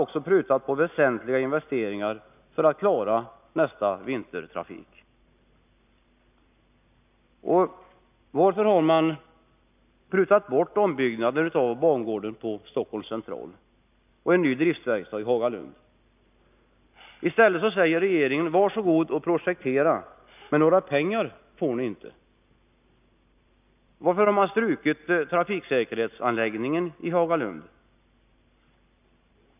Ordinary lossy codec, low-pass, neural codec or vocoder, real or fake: none; 3.6 kHz; none; real